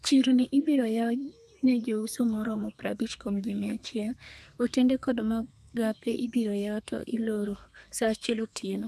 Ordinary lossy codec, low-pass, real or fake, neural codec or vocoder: none; 14.4 kHz; fake; codec, 32 kHz, 1.9 kbps, SNAC